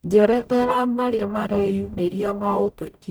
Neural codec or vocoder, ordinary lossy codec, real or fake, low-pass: codec, 44.1 kHz, 0.9 kbps, DAC; none; fake; none